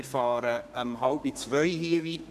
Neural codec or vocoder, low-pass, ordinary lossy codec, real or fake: codec, 32 kHz, 1.9 kbps, SNAC; 14.4 kHz; none; fake